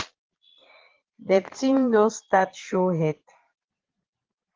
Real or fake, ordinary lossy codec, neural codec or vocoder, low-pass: fake; Opus, 16 kbps; vocoder, 22.05 kHz, 80 mel bands, Vocos; 7.2 kHz